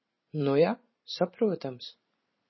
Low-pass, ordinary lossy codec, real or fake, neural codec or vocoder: 7.2 kHz; MP3, 24 kbps; fake; vocoder, 44.1 kHz, 128 mel bands every 512 samples, BigVGAN v2